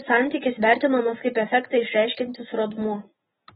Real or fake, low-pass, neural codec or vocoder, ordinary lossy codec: real; 19.8 kHz; none; AAC, 16 kbps